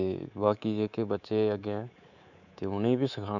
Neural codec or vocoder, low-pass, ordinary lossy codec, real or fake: codec, 24 kHz, 3.1 kbps, DualCodec; 7.2 kHz; none; fake